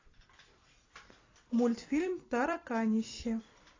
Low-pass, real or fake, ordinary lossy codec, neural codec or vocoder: 7.2 kHz; fake; AAC, 32 kbps; vocoder, 44.1 kHz, 128 mel bands every 256 samples, BigVGAN v2